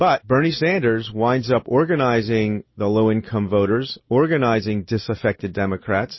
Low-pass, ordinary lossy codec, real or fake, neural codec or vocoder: 7.2 kHz; MP3, 24 kbps; real; none